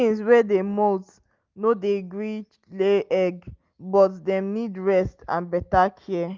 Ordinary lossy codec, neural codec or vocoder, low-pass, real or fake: Opus, 24 kbps; none; 7.2 kHz; real